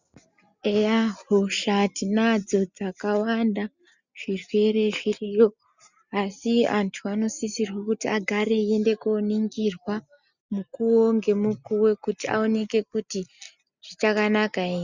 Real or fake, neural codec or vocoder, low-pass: real; none; 7.2 kHz